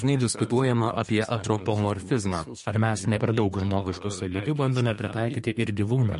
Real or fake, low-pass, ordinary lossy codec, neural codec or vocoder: fake; 10.8 kHz; MP3, 48 kbps; codec, 24 kHz, 1 kbps, SNAC